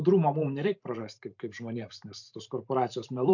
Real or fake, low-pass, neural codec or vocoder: fake; 7.2 kHz; vocoder, 44.1 kHz, 128 mel bands every 512 samples, BigVGAN v2